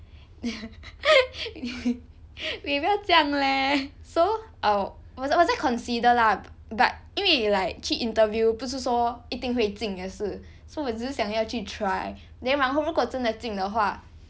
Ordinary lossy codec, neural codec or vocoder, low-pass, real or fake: none; none; none; real